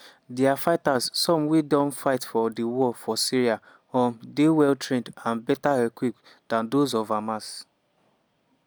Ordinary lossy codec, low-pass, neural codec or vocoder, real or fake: none; none; none; real